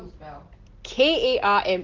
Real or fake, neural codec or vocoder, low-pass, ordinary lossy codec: real; none; 7.2 kHz; Opus, 16 kbps